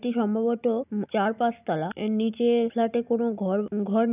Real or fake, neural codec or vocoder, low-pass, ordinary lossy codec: real; none; 3.6 kHz; none